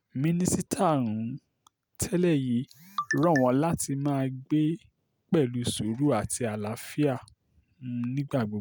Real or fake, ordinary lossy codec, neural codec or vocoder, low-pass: real; none; none; none